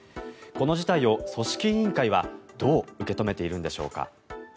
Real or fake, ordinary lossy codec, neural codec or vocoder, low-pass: real; none; none; none